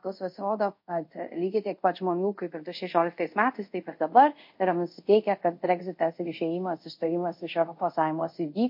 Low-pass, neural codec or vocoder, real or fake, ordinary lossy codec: 5.4 kHz; codec, 24 kHz, 0.5 kbps, DualCodec; fake; MP3, 32 kbps